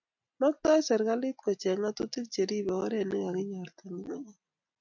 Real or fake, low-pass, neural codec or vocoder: real; 7.2 kHz; none